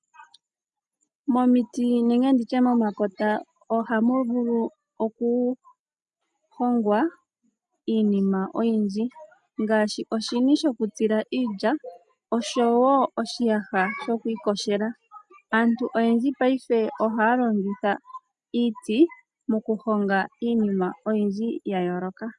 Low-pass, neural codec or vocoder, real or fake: 10.8 kHz; none; real